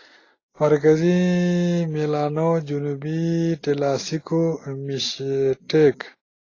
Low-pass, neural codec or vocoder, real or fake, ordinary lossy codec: 7.2 kHz; none; real; AAC, 32 kbps